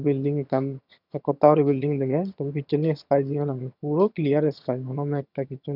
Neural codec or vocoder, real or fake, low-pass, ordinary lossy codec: vocoder, 22.05 kHz, 80 mel bands, HiFi-GAN; fake; 5.4 kHz; none